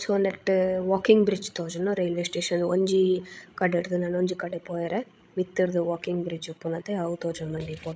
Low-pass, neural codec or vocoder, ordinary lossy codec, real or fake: none; codec, 16 kHz, 16 kbps, FreqCodec, larger model; none; fake